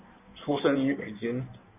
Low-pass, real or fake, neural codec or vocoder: 3.6 kHz; fake; codec, 16 kHz in and 24 kHz out, 1.1 kbps, FireRedTTS-2 codec